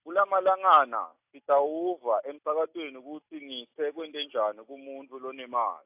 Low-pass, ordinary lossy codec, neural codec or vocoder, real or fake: 3.6 kHz; AAC, 32 kbps; none; real